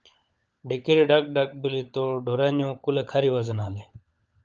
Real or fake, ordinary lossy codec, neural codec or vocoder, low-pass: fake; Opus, 24 kbps; codec, 16 kHz, 16 kbps, FunCodec, trained on LibriTTS, 50 frames a second; 7.2 kHz